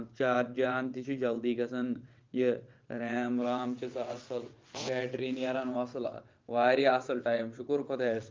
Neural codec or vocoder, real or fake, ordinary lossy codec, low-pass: vocoder, 22.05 kHz, 80 mel bands, WaveNeXt; fake; Opus, 24 kbps; 7.2 kHz